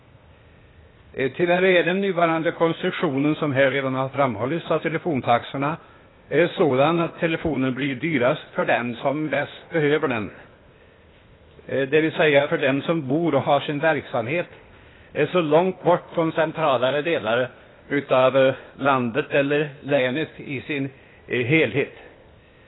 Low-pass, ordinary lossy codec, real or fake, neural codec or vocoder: 7.2 kHz; AAC, 16 kbps; fake; codec, 16 kHz, 0.8 kbps, ZipCodec